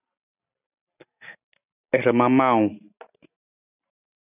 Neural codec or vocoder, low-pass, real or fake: none; 3.6 kHz; real